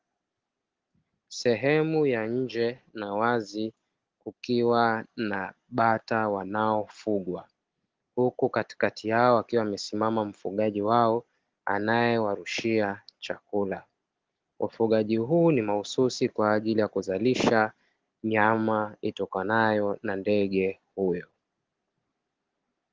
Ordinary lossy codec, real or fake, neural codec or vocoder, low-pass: Opus, 16 kbps; real; none; 7.2 kHz